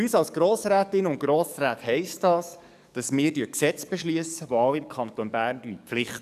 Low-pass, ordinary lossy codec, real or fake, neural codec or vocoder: 14.4 kHz; none; fake; codec, 44.1 kHz, 7.8 kbps, DAC